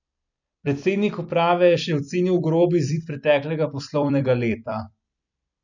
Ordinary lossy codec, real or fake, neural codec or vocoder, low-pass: none; fake; vocoder, 44.1 kHz, 128 mel bands every 256 samples, BigVGAN v2; 7.2 kHz